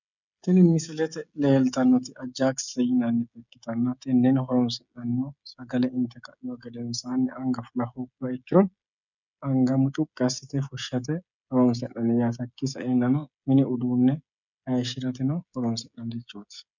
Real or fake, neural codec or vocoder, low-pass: fake; codec, 16 kHz, 16 kbps, FreqCodec, smaller model; 7.2 kHz